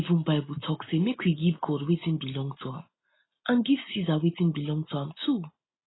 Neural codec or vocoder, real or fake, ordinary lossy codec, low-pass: none; real; AAC, 16 kbps; 7.2 kHz